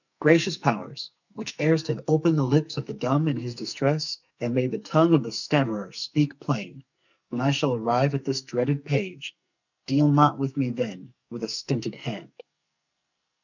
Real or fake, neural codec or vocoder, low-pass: fake; codec, 44.1 kHz, 2.6 kbps, SNAC; 7.2 kHz